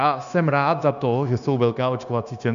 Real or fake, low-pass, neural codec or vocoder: fake; 7.2 kHz; codec, 16 kHz, 0.9 kbps, LongCat-Audio-Codec